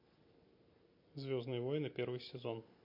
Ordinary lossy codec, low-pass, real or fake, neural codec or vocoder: MP3, 32 kbps; 5.4 kHz; real; none